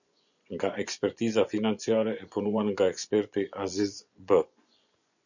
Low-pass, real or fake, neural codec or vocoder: 7.2 kHz; fake; vocoder, 24 kHz, 100 mel bands, Vocos